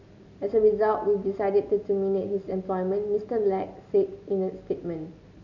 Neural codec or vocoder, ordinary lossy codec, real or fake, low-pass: none; none; real; 7.2 kHz